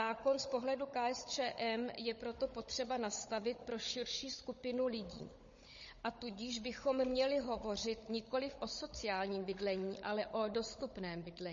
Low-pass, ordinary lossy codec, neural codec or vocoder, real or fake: 7.2 kHz; MP3, 32 kbps; codec, 16 kHz, 16 kbps, FunCodec, trained on Chinese and English, 50 frames a second; fake